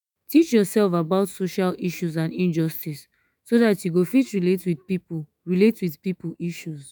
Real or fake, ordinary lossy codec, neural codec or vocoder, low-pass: fake; none; autoencoder, 48 kHz, 128 numbers a frame, DAC-VAE, trained on Japanese speech; none